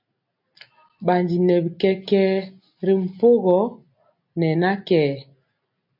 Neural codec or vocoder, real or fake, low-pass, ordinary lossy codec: none; real; 5.4 kHz; MP3, 48 kbps